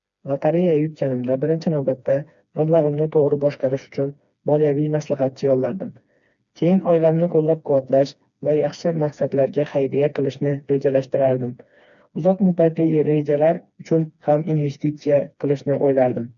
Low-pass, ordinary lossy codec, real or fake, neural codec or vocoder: 7.2 kHz; none; fake; codec, 16 kHz, 2 kbps, FreqCodec, smaller model